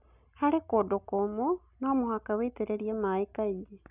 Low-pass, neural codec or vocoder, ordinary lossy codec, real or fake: 3.6 kHz; none; none; real